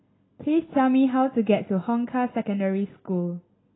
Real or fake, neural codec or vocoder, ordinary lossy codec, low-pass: real; none; AAC, 16 kbps; 7.2 kHz